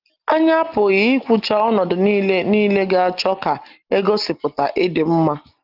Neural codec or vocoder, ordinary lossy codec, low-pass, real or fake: none; Opus, 24 kbps; 5.4 kHz; real